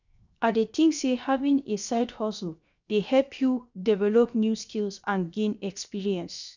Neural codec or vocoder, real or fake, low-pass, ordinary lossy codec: codec, 16 kHz, 0.7 kbps, FocalCodec; fake; 7.2 kHz; none